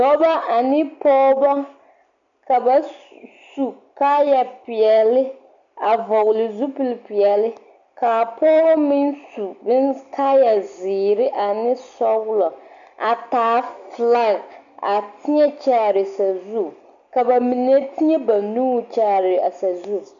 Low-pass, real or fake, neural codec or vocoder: 7.2 kHz; real; none